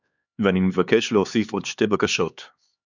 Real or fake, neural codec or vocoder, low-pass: fake; codec, 16 kHz, 4 kbps, X-Codec, HuBERT features, trained on LibriSpeech; 7.2 kHz